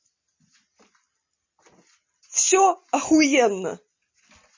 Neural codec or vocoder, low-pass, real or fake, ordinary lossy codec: none; 7.2 kHz; real; MP3, 32 kbps